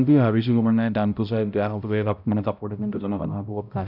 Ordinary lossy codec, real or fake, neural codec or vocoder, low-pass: none; fake; codec, 16 kHz, 0.5 kbps, X-Codec, HuBERT features, trained on balanced general audio; 5.4 kHz